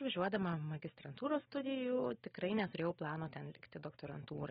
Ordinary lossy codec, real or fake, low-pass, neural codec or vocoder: AAC, 16 kbps; real; 19.8 kHz; none